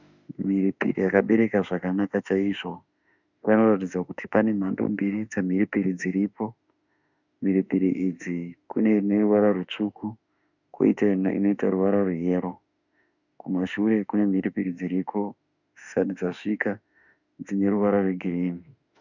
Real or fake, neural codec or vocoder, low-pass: fake; autoencoder, 48 kHz, 32 numbers a frame, DAC-VAE, trained on Japanese speech; 7.2 kHz